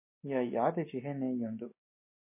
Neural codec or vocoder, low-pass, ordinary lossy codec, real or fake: none; 3.6 kHz; MP3, 24 kbps; real